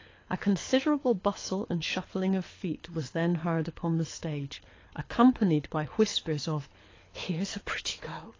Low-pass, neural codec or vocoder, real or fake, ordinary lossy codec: 7.2 kHz; codec, 24 kHz, 6 kbps, HILCodec; fake; AAC, 32 kbps